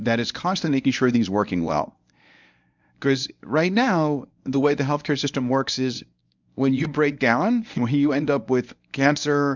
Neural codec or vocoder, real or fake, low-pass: codec, 24 kHz, 0.9 kbps, WavTokenizer, medium speech release version 1; fake; 7.2 kHz